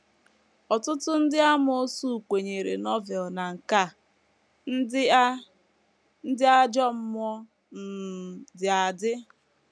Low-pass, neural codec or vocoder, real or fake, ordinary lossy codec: none; none; real; none